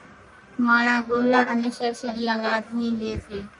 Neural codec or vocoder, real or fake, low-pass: codec, 44.1 kHz, 1.7 kbps, Pupu-Codec; fake; 10.8 kHz